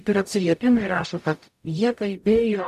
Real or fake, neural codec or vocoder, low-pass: fake; codec, 44.1 kHz, 0.9 kbps, DAC; 14.4 kHz